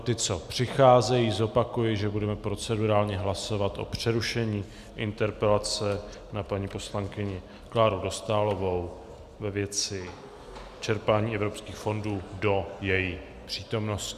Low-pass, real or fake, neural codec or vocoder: 14.4 kHz; real; none